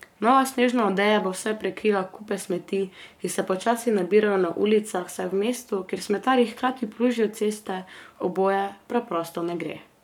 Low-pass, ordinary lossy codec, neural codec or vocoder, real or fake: 19.8 kHz; none; codec, 44.1 kHz, 7.8 kbps, Pupu-Codec; fake